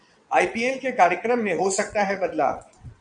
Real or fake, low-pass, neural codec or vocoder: fake; 9.9 kHz; vocoder, 22.05 kHz, 80 mel bands, WaveNeXt